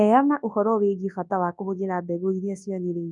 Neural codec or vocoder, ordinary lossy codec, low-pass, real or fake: codec, 24 kHz, 0.9 kbps, WavTokenizer, large speech release; none; 10.8 kHz; fake